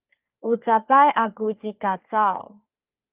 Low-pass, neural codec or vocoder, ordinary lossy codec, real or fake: 3.6 kHz; codec, 44.1 kHz, 3.4 kbps, Pupu-Codec; Opus, 24 kbps; fake